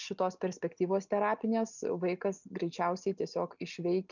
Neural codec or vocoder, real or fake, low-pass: none; real; 7.2 kHz